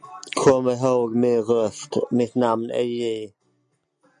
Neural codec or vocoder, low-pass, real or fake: none; 9.9 kHz; real